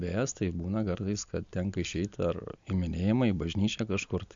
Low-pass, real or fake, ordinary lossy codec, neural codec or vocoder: 7.2 kHz; real; MP3, 64 kbps; none